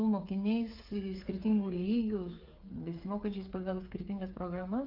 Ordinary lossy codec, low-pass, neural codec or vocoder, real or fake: Opus, 32 kbps; 5.4 kHz; codec, 16 kHz, 8 kbps, FreqCodec, smaller model; fake